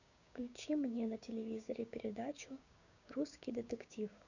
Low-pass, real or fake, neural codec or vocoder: 7.2 kHz; fake; codec, 16 kHz, 6 kbps, DAC